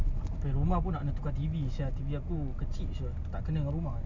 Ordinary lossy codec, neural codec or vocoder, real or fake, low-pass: none; none; real; 7.2 kHz